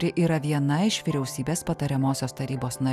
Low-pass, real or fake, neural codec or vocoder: 14.4 kHz; real; none